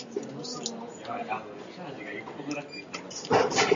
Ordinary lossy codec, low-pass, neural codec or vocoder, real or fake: AAC, 64 kbps; 7.2 kHz; none; real